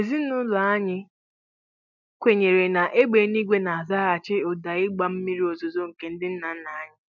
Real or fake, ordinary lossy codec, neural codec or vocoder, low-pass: real; none; none; 7.2 kHz